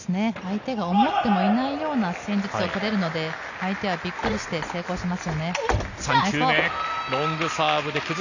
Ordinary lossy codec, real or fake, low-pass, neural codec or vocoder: none; real; 7.2 kHz; none